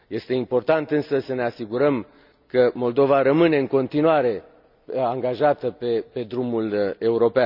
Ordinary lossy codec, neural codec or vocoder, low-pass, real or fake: none; none; 5.4 kHz; real